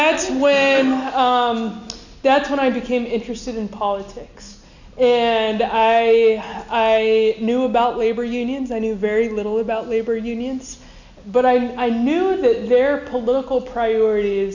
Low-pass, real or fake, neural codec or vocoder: 7.2 kHz; real; none